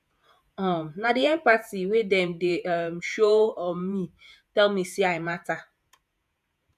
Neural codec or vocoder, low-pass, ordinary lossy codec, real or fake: vocoder, 48 kHz, 128 mel bands, Vocos; 14.4 kHz; none; fake